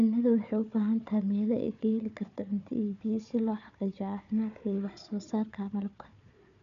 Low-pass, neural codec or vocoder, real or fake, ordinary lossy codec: 7.2 kHz; codec, 16 kHz, 4 kbps, FunCodec, trained on Chinese and English, 50 frames a second; fake; none